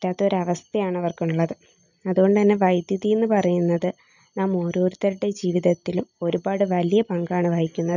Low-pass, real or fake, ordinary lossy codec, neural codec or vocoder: 7.2 kHz; real; none; none